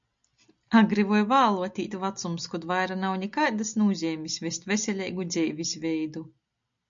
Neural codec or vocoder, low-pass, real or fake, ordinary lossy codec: none; 7.2 kHz; real; MP3, 64 kbps